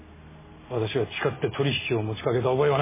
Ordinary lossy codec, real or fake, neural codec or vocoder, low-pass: MP3, 16 kbps; real; none; 3.6 kHz